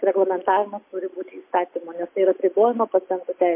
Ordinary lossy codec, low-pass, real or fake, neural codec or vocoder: MP3, 24 kbps; 3.6 kHz; real; none